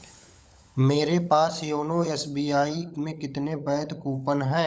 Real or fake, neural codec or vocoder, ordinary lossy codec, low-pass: fake; codec, 16 kHz, 16 kbps, FunCodec, trained on LibriTTS, 50 frames a second; none; none